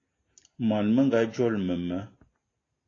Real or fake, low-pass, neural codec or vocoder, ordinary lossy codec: real; 7.2 kHz; none; AAC, 32 kbps